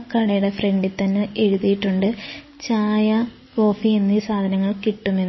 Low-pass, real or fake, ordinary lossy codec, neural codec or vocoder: 7.2 kHz; real; MP3, 24 kbps; none